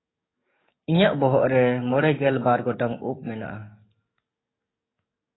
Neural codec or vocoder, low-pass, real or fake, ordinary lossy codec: codec, 44.1 kHz, 7.8 kbps, DAC; 7.2 kHz; fake; AAC, 16 kbps